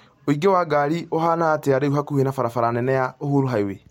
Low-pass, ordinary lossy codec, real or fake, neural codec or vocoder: 19.8 kHz; MP3, 64 kbps; real; none